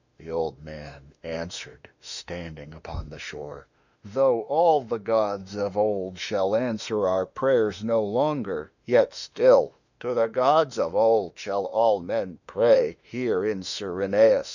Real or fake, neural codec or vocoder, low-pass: fake; autoencoder, 48 kHz, 32 numbers a frame, DAC-VAE, trained on Japanese speech; 7.2 kHz